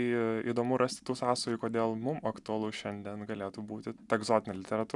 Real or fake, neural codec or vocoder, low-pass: real; none; 10.8 kHz